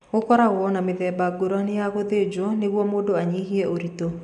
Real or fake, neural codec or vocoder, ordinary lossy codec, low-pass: real; none; none; 10.8 kHz